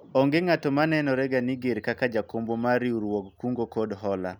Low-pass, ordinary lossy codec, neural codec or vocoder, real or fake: none; none; none; real